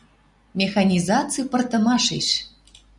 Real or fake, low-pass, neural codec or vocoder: real; 10.8 kHz; none